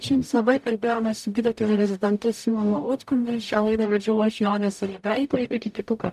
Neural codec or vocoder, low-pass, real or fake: codec, 44.1 kHz, 0.9 kbps, DAC; 14.4 kHz; fake